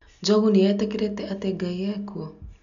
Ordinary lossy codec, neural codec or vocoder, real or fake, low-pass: none; none; real; 7.2 kHz